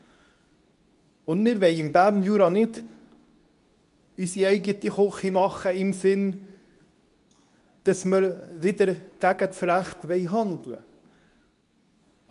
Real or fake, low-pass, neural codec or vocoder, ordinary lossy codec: fake; 10.8 kHz; codec, 24 kHz, 0.9 kbps, WavTokenizer, medium speech release version 2; none